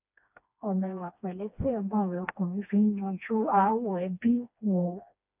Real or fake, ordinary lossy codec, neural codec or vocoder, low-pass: fake; none; codec, 16 kHz, 2 kbps, FreqCodec, smaller model; 3.6 kHz